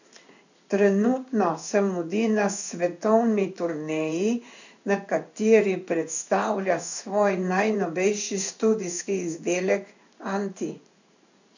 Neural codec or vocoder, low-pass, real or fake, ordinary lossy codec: codec, 16 kHz in and 24 kHz out, 1 kbps, XY-Tokenizer; 7.2 kHz; fake; none